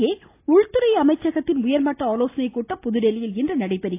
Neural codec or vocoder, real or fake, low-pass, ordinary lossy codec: none; real; 3.6 kHz; AAC, 24 kbps